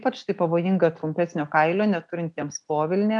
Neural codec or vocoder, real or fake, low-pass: none; real; 10.8 kHz